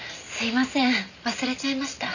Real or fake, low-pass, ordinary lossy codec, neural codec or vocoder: real; 7.2 kHz; none; none